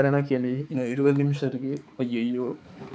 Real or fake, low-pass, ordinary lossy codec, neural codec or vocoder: fake; none; none; codec, 16 kHz, 4 kbps, X-Codec, HuBERT features, trained on balanced general audio